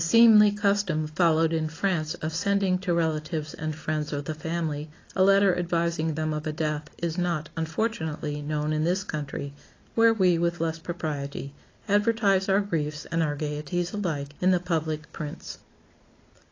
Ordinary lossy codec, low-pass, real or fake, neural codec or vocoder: AAC, 32 kbps; 7.2 kHz; real; none